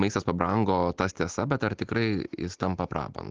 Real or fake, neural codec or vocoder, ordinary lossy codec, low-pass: real; none; Opus, 16 kbps; 7.2 kHz